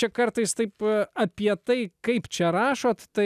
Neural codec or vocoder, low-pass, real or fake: none; 14.4 kHz; real